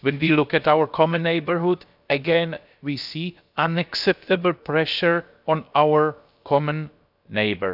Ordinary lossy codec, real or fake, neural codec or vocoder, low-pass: none; fake; codec, 16 kHz, about 1 kbps, DyCAST, with the encoder's durations; 5.4 kHz